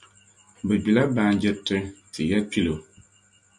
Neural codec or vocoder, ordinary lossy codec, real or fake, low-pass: none; MP3, 64 kbps; real; 10.8 kHz